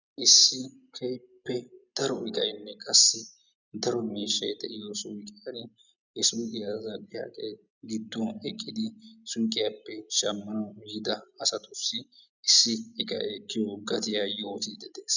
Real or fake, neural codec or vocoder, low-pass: real; none; 7.2 kHz